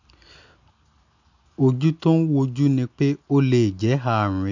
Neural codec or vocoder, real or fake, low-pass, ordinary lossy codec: none; real; 7.2 kHz; none